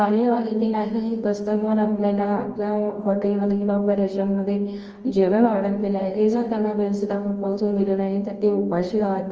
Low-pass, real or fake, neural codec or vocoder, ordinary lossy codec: 7.2 kHz; fake; codec, 24 kHz, 0.9 kbps, WavTokenizer, medium music audio release; Opus, 24 kbps